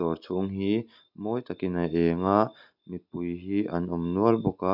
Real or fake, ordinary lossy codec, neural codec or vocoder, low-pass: real; none; none; 5.4 kHz